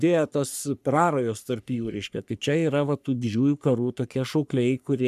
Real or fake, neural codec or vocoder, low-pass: fake; codec, 44.1 kHz, 3.4 kbps, Pupu-Codec; 14.4 kHz